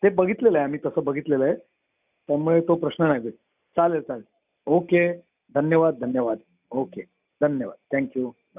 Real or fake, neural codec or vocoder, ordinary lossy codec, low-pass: real; none; none; 3.6 kHz